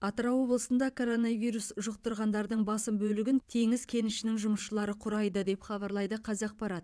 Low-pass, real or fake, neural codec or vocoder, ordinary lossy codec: none; fake; vocoder, 22.05 kHz, 80 mel bands, WaveNeXt; none